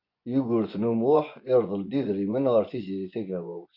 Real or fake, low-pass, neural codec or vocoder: fake; 5.4 kHz; vocoder, 24 kHz, 100 mel bands, Vocos